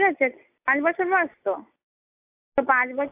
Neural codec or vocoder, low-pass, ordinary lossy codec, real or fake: none; 3.6 kHz; AAC, 24 kbps; real